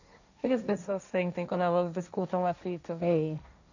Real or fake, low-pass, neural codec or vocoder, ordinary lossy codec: fake; 7.2 kHz; codec, 16 kHz, 1.1 kbps, Voila-Tokenizer; none